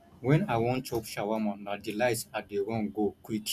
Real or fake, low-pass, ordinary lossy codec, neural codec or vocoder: real; 14.4 kHz; none; none